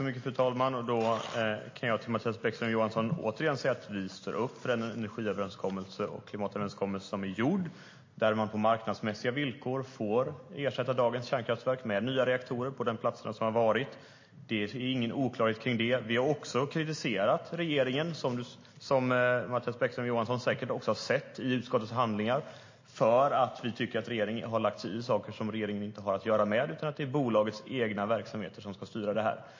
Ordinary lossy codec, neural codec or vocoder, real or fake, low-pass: MP3, 32 kbps; none; real; 7.2 kHz